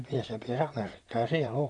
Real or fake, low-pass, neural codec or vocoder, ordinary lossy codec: fake; 10.8 kHz; vocoder, 44.1 kHz, 128 mel bands, Pupu-Vocoder; none